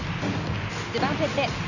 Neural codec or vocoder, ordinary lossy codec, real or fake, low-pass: none; none; real; 7.2 kHz